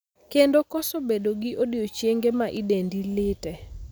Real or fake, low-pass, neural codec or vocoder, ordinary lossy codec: real; none; none; none